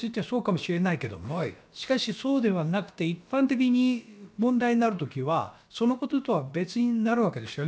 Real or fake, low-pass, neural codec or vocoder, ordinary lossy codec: fake; none; codec, 16 kHz, about 1 kbps, DyCAST, with the encoder's durations; none